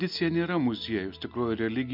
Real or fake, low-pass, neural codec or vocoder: real; 5.4 kHz; none